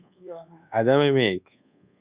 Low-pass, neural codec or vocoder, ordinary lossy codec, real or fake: 3.6 kHz; codec, 24 kHz, 1.2 kbps, DualCodec; Opus, 64 kbps; fake